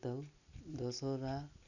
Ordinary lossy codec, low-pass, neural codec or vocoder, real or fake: none; 7.2 kHz; none; real